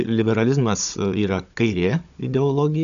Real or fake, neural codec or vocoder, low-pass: fake; codec, 16 kHz, 16 kbps, FunCodec, trained on Chinese and English, 50 frames a second; 7.2 kHz